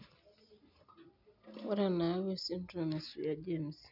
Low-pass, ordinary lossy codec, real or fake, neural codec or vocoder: 5.4 kHz; none; real; none